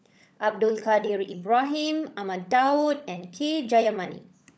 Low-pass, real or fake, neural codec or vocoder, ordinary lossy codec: none; fake; codec, 16 kHz, 16 kbps, FunCodec, trained on LibriTTS, 50 frames a second; none